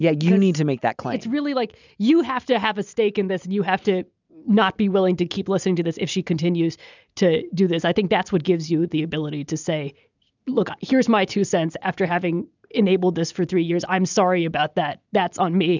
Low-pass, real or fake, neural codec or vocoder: 7.2 kHz; real; none